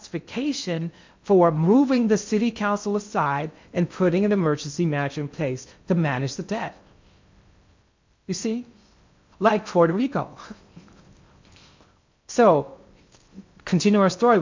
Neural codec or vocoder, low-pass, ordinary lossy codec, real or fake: codec, 16 kHz in and 24 kHz out, 0.6 kbps, FocalCodec, streaming, 2048 codes; 7.2 kHz; MP3, 64 kbps; fake